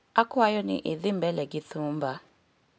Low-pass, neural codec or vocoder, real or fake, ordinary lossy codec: none; none; real; none